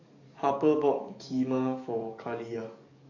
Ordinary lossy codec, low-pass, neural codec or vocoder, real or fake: none; 7.2 kHz; codec, 44.1 kHz, 7.8 kbps, DAC; fake